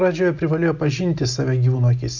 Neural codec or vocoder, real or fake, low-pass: none; real; 7.2 kHz